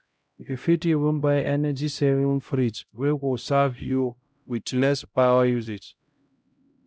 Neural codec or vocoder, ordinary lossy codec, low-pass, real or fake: codec, 16 kHz, 0.5 kbps, X-Codec, HuBERT features, trained on LibriSpeech; none; none; fake